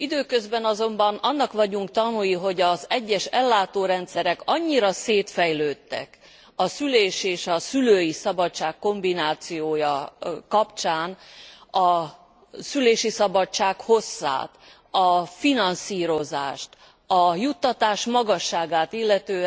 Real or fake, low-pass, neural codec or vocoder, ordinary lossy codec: real; none; none; none